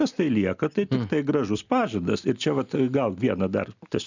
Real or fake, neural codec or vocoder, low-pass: real; none; 7.2 kHz